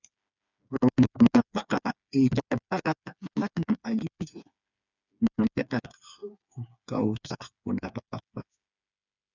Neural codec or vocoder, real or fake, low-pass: codec, 16 kHz, 4 kbps, FreqCodec, smaller model; fake; 7.2 kHz